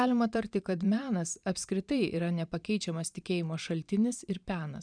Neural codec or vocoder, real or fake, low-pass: vocoder, 44.1 kHz, 128 mel bands every 512 samples, BigVGAN v2; fake; 9.9 kHz